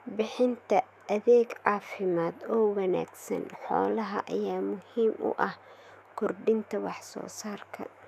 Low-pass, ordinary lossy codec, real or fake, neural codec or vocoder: 14.4 kHz; none; fake; autoencoder, 48 kHz, 128 numbers a frame, DAC-VAE, trained on Japanese speech